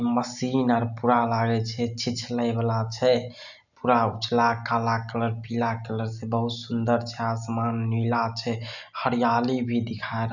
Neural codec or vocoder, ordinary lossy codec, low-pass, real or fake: none; none; 7.2 kHz; real